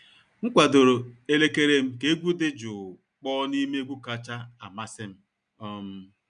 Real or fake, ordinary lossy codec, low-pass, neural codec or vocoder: real; none; 9.9 kHz; none